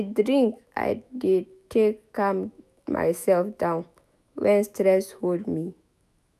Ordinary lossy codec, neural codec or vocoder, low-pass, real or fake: none; autoencoder, 48 kHz, 128 numbers a frame, DAC-VAE, trained on Japanese speech; 14.4 kHz; fake